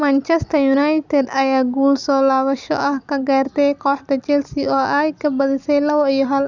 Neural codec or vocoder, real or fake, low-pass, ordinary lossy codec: none; real; 7.2 kHz; none